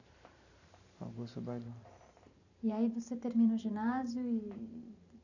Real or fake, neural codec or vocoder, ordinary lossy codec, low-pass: fake; vocoder, 44.1 kHz, 128 mel bands every 512 samples, BigVGAN v2; none; 7.2 kHz